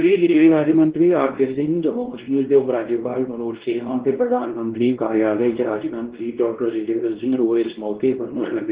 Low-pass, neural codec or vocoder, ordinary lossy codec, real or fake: 3.6 kHz; codec, 16 kHz, 1 kbps, X-Codec, WavLM features, trained on Multilingual LibriSpeech; Opus, 16 kbps; fake